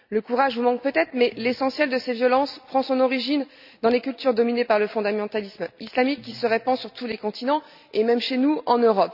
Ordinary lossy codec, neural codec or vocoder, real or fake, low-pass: none; none; real; 5.4 kHz